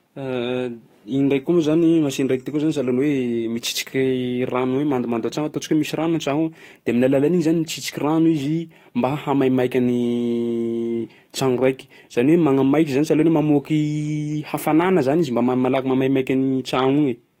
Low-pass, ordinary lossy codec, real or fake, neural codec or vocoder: 19.8 kHz; AAC, 48 kbps; fake; codec, 44.1 kHz, 7.8 kbps, DAC